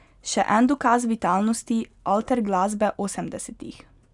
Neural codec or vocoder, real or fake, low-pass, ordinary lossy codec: none; real; 10.8 kHz; none